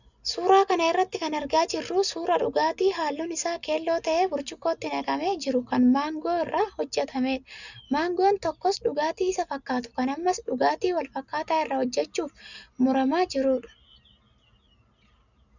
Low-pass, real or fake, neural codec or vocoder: 7.2 kHz; real; none